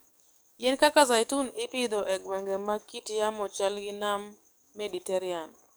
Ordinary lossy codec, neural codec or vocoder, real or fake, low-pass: none; codec, 44.1 kHz, 7.8 kbps, DAC; fake; none